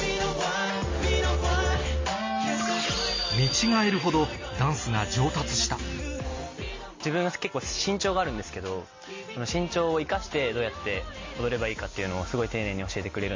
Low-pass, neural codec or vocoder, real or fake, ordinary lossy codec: 7.2 kHz; none; real; MP3, 32 kbps